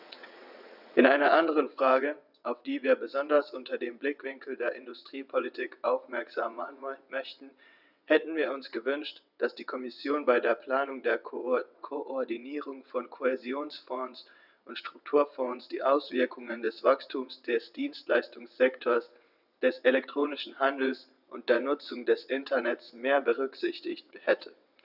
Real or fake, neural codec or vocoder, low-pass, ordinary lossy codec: fake; vocoder, 22.05 kHz, 80 mel bands, WaveNeXt; 5.4 kHz; none